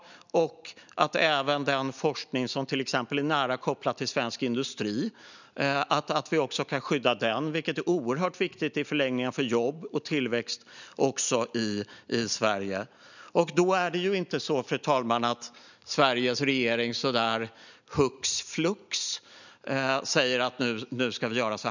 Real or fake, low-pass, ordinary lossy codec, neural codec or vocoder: real; 7.2 kHz; none; none